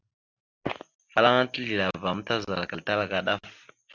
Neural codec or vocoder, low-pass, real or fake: none; 7.2 kHz; real